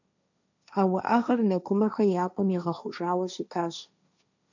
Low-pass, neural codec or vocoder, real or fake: 7.2 kHz; codec, 16 kHz, 1.1 kbps, Voila-Tokenizer; fake